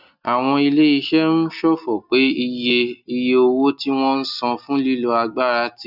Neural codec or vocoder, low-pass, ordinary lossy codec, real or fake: none; 5.4 kHz; none; real